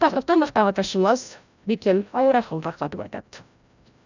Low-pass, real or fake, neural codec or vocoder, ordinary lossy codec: 7.2 kHz; fake; codec, 16 kHz, 0.5 kbps, FreqCodec, larger model; none